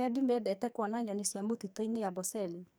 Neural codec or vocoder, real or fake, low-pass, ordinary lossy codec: codec, 44.1 kHz, 2.6 kbps, SNAC; fake; none; none